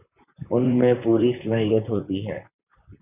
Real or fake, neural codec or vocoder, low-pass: fake; vocoder, 44.1 kHz, 128 mel bands, Pupu-Vocoder; 3.6 kHz